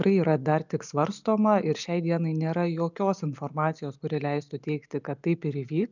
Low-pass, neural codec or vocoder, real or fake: 7.2 kHz; none; real